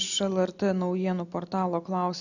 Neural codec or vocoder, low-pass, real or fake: none; 7.2 kHz; real